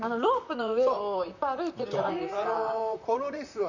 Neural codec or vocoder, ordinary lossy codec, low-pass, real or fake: vocoder, 44.1 kHz, 128 mel bands, Pupu-Vocoder; none; 7.2 kHz; fake